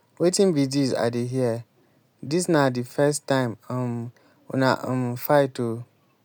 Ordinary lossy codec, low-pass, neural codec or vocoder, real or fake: none; none; none; real